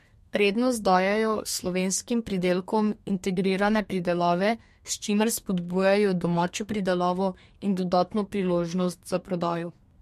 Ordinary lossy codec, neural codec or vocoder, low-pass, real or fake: MP3, 64 kbps; codec, 32 kHz, 1.9 kbps, SNAC; 14.4 kHz; fake